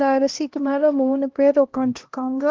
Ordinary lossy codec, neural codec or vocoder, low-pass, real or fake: Opus, 16 kbps; codec, 16 kHz, 1 kbps, X-Codec, HuBERT features, trained on LibriSpeech; 7.2 kHz; fake